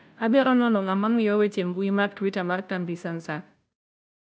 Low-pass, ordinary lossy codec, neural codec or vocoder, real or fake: none; none; codec, 16 kHz, 0.5 kbps, FunCodec, trained on Chinese and English, 25 frames a second; fake